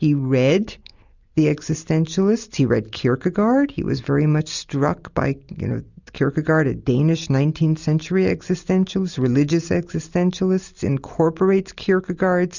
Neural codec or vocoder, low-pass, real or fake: none; 7.2 kHz; real